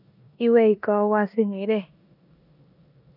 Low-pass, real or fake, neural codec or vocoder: 5.4 kHz; fake; codec, 16 kHz in and 24 kHz out, 0.9 kbps, LongCat-Audio-Codec, four codebook decoder